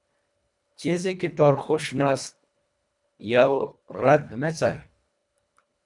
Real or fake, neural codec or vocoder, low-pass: fake; codec, 24 kHz, 1.5 kbps, HILCodec; 10.8 kHz